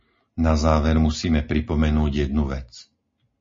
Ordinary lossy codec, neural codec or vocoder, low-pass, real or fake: MP3, 32 kbps; none; 7.2 kHz; real